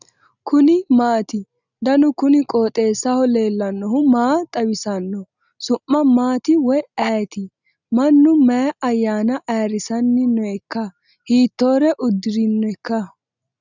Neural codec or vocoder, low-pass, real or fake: none; 7.2 kHz; real